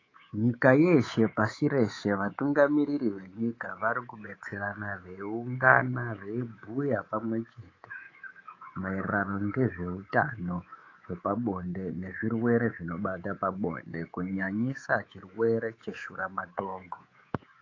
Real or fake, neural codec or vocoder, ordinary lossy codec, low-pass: fake; codec, 24 kHz, 3.1 kbps, DualCodec; AAC, 32 kbps; 7.2 kHz